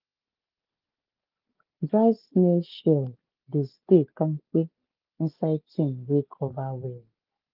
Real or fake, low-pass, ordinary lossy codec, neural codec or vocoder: real; 5.4 kHz; Opus, 32 kbps; none